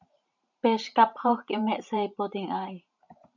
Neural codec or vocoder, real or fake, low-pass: vocoder, 44.1 kHz, 128 mel bands every 512 samples, BigVGAN v2; fake; 7.2 kHz